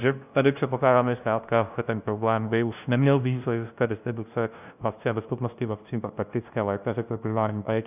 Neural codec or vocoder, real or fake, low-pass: codec, 16 kHz, 0.5 kbps, FunCodec, trained on LibriTTS, 25 frames a second; fake; 3.6 kHz